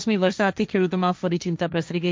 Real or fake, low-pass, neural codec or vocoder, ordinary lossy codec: fake; none; codec, 16 kHz, 1.1 kbps, Voila-Tokenizer; none